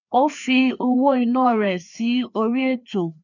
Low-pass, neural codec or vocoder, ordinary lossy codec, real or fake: 7.2 kHz; codec, 16 kHz, 2 kbps, FreqCodec, larger model; none; fake